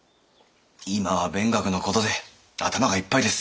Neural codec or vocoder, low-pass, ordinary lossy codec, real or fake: none; none; none; real